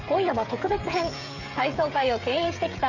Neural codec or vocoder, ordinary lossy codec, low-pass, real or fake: codec, 16 kHz, 16 kbps, FreqCodec, smaller model; none; 7.2 kHz; fake